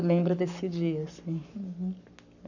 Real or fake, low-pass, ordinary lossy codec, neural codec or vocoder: fake; 7.2 kHz; none; codec, 44.1 kHz, 7.8 kbps, Pupu-Codec